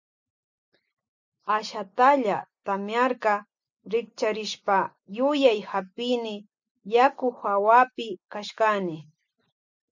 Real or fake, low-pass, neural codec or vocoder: real; 7.2 kHz; none